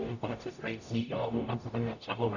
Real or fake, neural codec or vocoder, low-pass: fake; codec, 44.1 kHz, 0.9 kbps, DAC; 7.2 kHz